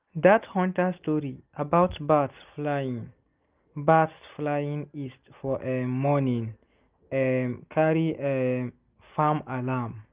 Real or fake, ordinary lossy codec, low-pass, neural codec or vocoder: real; Opus, 32 kbps; 3.6 kHz; none